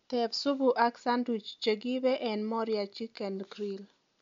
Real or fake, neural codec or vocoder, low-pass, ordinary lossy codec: real; none; 7.2 kHz; MP3, 64 kbps